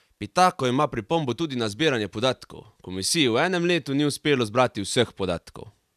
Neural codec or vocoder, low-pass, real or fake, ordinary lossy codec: vocoder, 44.1 kHz, 128 mel bands every 256 samples, BigVGAN v2; 14.4 kHz; fake; none